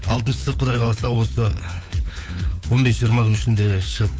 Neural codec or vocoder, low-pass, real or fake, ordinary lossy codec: codec, 16 kHz, 4 kbps, FunCodec, trained on LibriTTS, 50 frames a second; none; fake; none